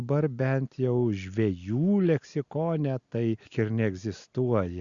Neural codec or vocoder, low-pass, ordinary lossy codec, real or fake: none; 7.2 kHz; Opus, 64 kbps; real